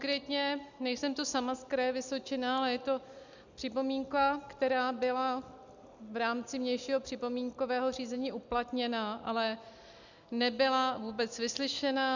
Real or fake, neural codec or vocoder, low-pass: real; none; 7.2 kHz